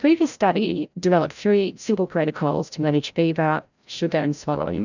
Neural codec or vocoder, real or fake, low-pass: codec, 16 kHz, 0.5 kbps, FreqCodec, larger model; fake; 7.2 kHz